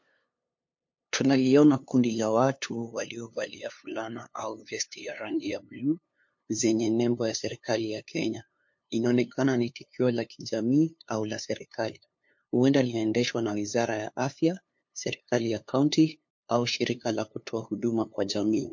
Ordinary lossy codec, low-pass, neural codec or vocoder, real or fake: MP3, 48 kbps; 7.2 kHz; codec, 16 kHz, 2 kbps, FunCodec, trained on LibriTTS, 25 frames a second; fake